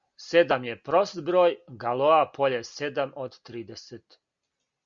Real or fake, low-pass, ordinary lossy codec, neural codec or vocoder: real; 7.2 kHz; Opus, 64 kbps; none